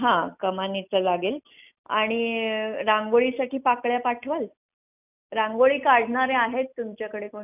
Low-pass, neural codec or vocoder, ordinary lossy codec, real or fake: 3.6 kHz; none; none; real